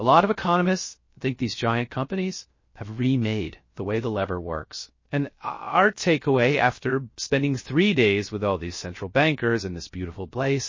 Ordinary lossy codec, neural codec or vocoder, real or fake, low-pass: MP3, 32 kbps; codec, 16 kHz, 0.3 kbps, FocalCodec; fake; 7.2 kHz